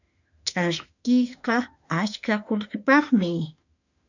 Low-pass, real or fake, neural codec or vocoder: 7.2 kHz; fake; autoencoder, 48 kHz, 32 numbers a frame, DAC-VAE, trained on Japanese speech